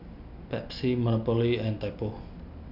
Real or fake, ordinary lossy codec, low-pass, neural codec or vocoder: real; none; 5.4 kHz; none